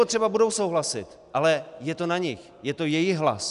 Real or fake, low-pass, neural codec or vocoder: real; 10.8 kHz; none